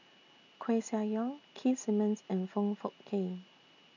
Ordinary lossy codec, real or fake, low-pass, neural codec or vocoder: none; real; 7.2 kHz; none